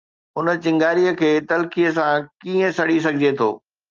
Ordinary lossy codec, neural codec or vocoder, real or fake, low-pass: Opus, 24 kbps; none; real; 7.2 kHz